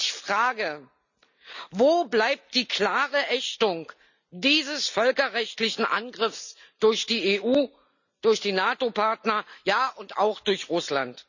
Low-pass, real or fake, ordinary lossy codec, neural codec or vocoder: 7.2 kHz; real; none; none